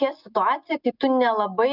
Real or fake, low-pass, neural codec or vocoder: real; 5.4 kHz; none